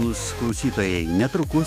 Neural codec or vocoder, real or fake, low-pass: autoencoder, 48 kHz, 128 numbers a frame, DAC-VAE, trained on Japanese speech; fake; 19.8 kHz